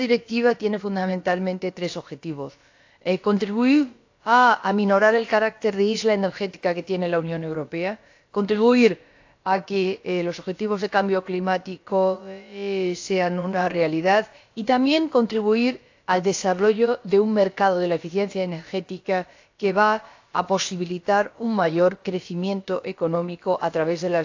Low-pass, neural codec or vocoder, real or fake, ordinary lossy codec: 7.2 kHz; codec, 16 kHz, about 1 kbps, DyCAST, with the encoder's durations; fake; AAC, 48 kbps